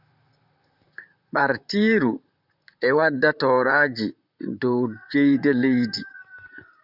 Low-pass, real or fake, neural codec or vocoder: 5.4 kHz; fake; vocoder, 44.1 kHz, 128 mel bands, Pupu-Vocoder